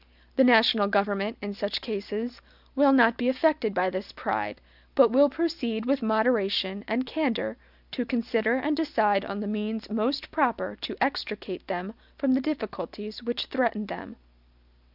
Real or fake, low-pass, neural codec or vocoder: real; 5.4 kHz; none